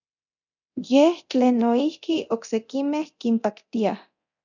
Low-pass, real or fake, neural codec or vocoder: 7.2 kHz; fake; codec, 24 kHz, 0.9 kbps, DualCodec